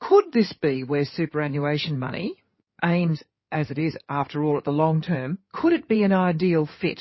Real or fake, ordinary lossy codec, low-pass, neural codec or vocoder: fake; MP3, 24 kbps; 7.2 kHz; codec, 16 kHz in and 24 kHz out, 2.2 kbps, FireRedTTS-2 codec